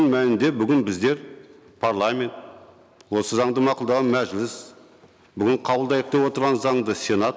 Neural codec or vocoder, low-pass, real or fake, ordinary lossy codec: none; none; real; none